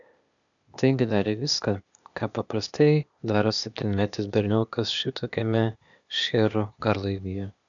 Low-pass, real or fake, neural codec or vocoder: 7.2 kHz; fake; codec, 16 kHz, 0.8 kbps, ZipCodec